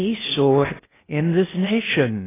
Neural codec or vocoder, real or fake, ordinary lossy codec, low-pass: codec, 16 kHz in and 24 kHz out, 0.6 kbps, FocalCodec, streaming, 4096 codes; fake; AAC, 16 kbps; 3.6 kHz